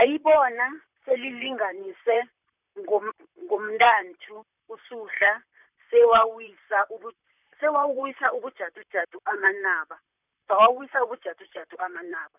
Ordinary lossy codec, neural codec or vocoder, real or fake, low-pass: none; vocoder, 44.1 kHz, 128 mel bands, Pupu-Vocoder; fake; 3.6 kHz